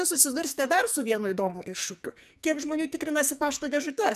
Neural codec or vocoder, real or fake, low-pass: codec, 44.1 kHz, 2.6 kbps, SNAC; fake; 14.4 kHz